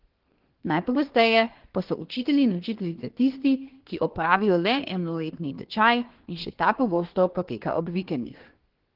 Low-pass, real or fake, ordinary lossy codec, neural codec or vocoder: 5.4 kHz; fake; Opus, 16 kbps; codec, 24 kHz, 1 kbps, SNAC